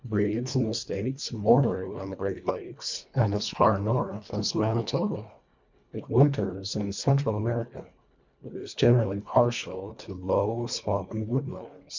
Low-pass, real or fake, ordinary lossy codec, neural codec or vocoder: 7.2 kHz; fake; MP3, 64 kbps; codec, 24 kHz, 1.5 kbps, HILCodec